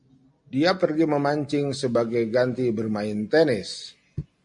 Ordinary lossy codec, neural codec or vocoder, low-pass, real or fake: MP3, 48 kbps; none; 10.8 kHz; real